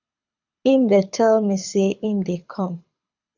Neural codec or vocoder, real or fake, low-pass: codec, 24 kHz, 6 kbps, HILCodec; fake; 7.2 kHz